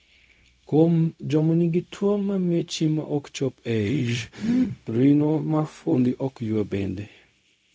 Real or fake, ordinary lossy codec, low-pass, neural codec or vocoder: fake; none; none; codec, 16 kHz, 0.4 kbps, LongCat-Audio-Codec